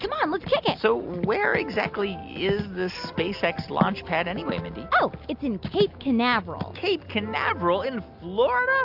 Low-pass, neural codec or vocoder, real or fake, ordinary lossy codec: 5.4 kHz; none; real; AAC, 48 kbps